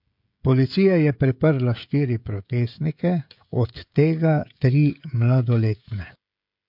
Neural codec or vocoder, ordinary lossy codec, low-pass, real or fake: codec, 16 kHz, 16 kbps, FreqCodec, smaller model; MP3, 48 kbps; 5.4 kHz; fake